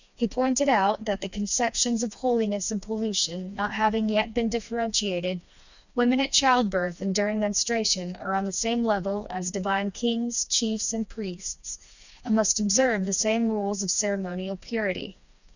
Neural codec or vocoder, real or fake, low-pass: codec, 16 kHz, 2 kbps, FreqCodec, smaller model; fake; 7.2 kHz